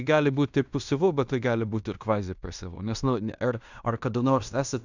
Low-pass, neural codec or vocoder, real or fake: 7.2 kHz; codec, 16 kHz in and 24 kHz out, 0.9 kbps, LongCat-Audio-Codec, fine tuned four codebook decoder; fake